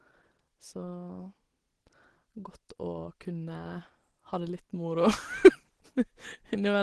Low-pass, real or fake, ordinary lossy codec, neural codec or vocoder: 10.8 kHz; real; Opus, 16 kbps; none